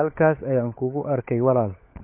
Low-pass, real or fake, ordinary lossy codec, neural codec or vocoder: 3.6 kHz; fake; MP3, 24 kbps; codec, 16 kHz, 16 kbps, FunCodec, trained on LibriTTS, 50 frames a second